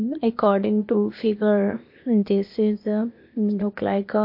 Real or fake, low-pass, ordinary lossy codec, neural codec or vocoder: fake; 5.4 kHz; MP3, 32 kbps; codec, 16 kHz, 0.8 kbps, ZipCodec